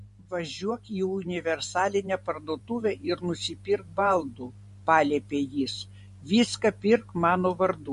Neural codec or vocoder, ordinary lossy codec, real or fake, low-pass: vocoder, 44.1 kHz, 128 mel bands every 512 samples, BigVGAN v2; MP3, 48 kbps; fake; 14.4 kHz